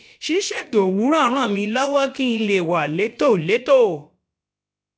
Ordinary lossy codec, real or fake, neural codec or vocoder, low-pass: none; fake; codec, 16 kHz, about 1 kbps, DyCAST, with the encoder's durations; none